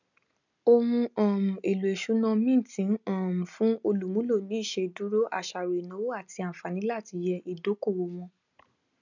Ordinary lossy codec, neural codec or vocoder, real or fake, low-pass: none; none; real; 7.2 kHz